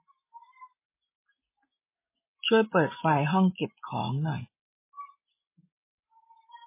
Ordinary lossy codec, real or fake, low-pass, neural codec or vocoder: MP3, 16 kbps; real; 3.6 kHz; none